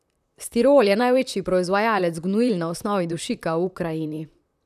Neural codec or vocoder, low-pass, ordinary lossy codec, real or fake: vocoder, 44.1 kHz, 128 mel bands, Pupu-Vocoder; 14.4 kHz; none; fake